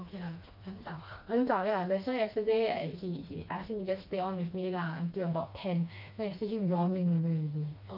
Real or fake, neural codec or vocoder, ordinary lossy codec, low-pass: fake; codec, 16 kHz, 2 kbps, FreqCodec, smaller model; none; 5.4 kHz